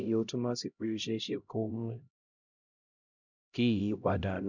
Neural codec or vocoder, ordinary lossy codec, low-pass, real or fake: codec, 16 kHz, 0.5 kbps, X-Codec, HuBERT features, trained on LibriSpeech; none; 7.2 kHz; fake